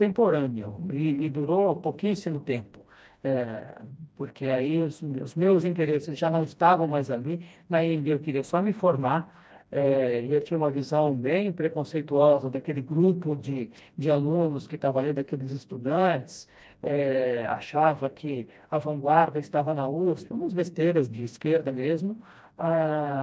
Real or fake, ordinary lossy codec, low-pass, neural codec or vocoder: fake; none; none; codec, 16 kHz, 1 kbps, FreqCodec, smaller model